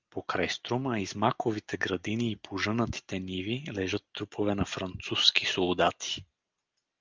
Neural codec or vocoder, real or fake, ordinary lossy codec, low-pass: none; real; Opus, 24 kbps; 7.2 kHz